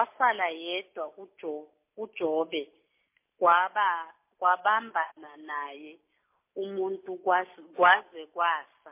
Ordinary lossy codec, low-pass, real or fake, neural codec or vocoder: MP3, 24 kbps; 3.6 kHz; real; none